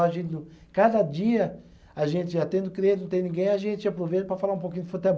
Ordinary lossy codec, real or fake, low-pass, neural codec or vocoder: none; real; none; none